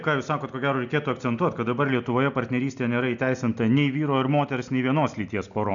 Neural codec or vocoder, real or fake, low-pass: none; real; 7.2 kHz